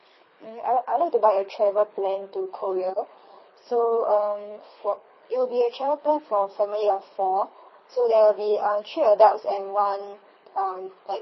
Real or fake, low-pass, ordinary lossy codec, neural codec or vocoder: fake; 7.2 kHz; MP3, 24 kbps; codec, 24 kHz, 3 kbps, HILCodec